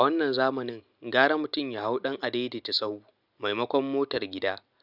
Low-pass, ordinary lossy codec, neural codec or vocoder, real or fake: 5.4 kHz; none; none; real